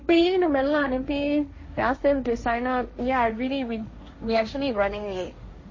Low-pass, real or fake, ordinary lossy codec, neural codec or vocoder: 7.2 kHz; fake; MP3, 32 kbps; codec, 16 kHz, 1.1 kbps, Voila-Tokenizer